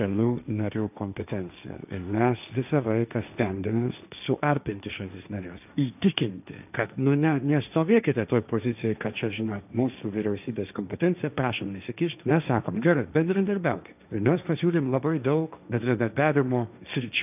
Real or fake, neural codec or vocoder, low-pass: fake; codec, 16 kHz, 1.1 kbps, Voila-Tokenizer; 3.6 kHz